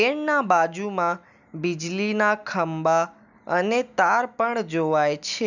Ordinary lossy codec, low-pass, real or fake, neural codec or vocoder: none; 7.2 kHz; real; none